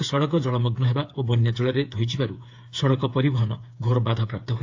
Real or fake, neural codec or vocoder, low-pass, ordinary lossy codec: fake; codec, 16 kHz, 8 kbps, FreqCodec, smaller model; 7.2 kHz; AAC, 48 kbps